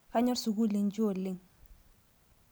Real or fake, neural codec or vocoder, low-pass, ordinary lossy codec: real; none; none; none